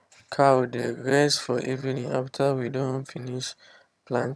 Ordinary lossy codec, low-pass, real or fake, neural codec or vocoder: none; none; fake; vocoder, 22.05 kHz, 80 mel bands, HiFi-GAN